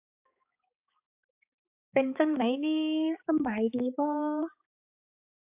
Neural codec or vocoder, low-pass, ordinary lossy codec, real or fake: codec, 16 kHz, 4 kbps, X-Codec, HuBERT features, trained on balanced general audio; 3.6 kHz; AAC, 32 kbps; fake